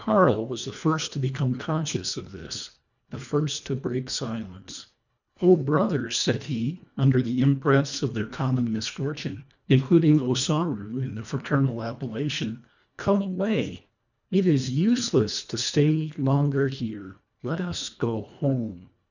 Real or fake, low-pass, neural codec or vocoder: fake; 7.2 kHz; codec, 24 kHz, 1.5 kbps, HILCodec